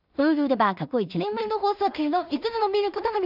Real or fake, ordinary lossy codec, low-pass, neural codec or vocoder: fake; none; 5.4 kHz; codec, 16 kHz in and 24 kHz out, 0.4 kbps, LongCat-Audio-Codec, two codebook decoder